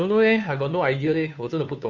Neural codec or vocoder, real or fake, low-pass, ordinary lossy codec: codec, 16 kHz, 2 kbps, FunCodec, trained on Chinese and English, 25 frames a second; fake; 7.2 kHz; none